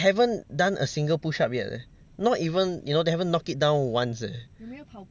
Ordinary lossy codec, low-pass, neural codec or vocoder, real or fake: none; none; none; real